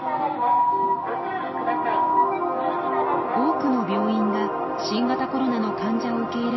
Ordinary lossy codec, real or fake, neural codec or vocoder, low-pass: MP3, 24 kbps; real; none; 7.2 kHz